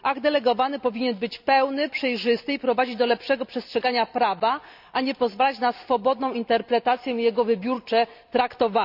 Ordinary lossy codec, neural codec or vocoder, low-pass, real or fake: AAC, 48 kbps; none; 5.4 kHz; real